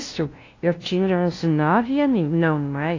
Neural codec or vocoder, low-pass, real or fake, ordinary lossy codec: codec, 16 kHz, 0.5 kbps, FunCodec, trained on LibriTTS, 25 frames a second; 7.2 kHz; fake; AAC, 32 kbps